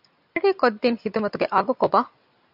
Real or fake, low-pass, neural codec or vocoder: real; 5.4 kHz; none